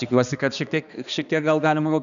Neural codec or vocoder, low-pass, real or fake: codec, 16 kHz, 2 kbps, X-Codec, HuBERT features, trained on balanced general audio; 7.2 kHz; fake